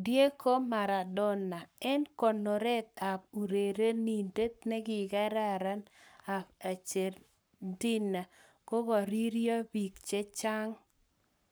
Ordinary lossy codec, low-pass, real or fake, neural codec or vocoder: none; none; fake; codec, 44.1 kHz, 7.8 kbps, Pupu-Codec